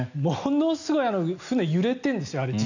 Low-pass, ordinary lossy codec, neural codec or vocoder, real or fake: 7.2 kHz; none; none; real